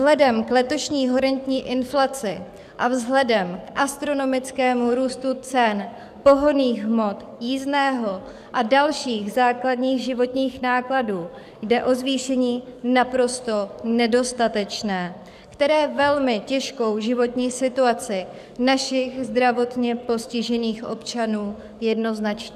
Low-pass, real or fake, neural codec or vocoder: 14.4 kHz; fake; codec, 44.1 kHz, 7.8 kbps, DAC